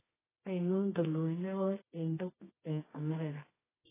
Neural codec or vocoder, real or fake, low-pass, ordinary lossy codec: codec, 24 kHz, 0.9 kbps, WavTokenizer, medium music audio release; fake; 3.6 kHz; AAC, 16 kbps